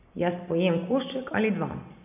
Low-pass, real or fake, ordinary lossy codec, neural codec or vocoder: 3.6 kHz; real; none; none